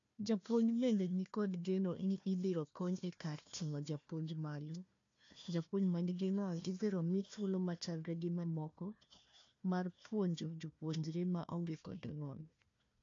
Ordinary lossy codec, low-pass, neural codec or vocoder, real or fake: AAC, 48 kbps; 7.2 kHz; codec, 16 kHz, 1 kbps, FunCodec, trained on Chinese and English, 50 frames a second; fake